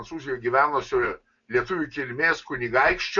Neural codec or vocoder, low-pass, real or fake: none; 7.2 kHz; real